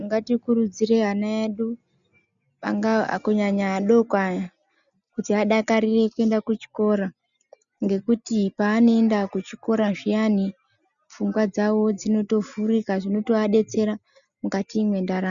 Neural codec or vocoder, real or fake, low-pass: none; real; 7.2 kHz